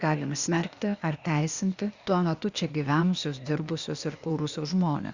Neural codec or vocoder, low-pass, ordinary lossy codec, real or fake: codec, 16 kHz, 0.8 kbps, ZipCodec; 7.2 kHz; Opus, 64 kbps; fake